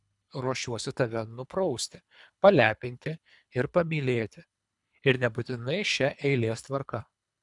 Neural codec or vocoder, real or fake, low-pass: codec, 24 kHz, 3 kbps, HILCodec; fake; 10.8 kHz